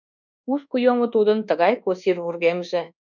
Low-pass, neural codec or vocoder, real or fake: 7.2 kHz; codec, 24 kHz, 1.2 kbps, DualCodec; fake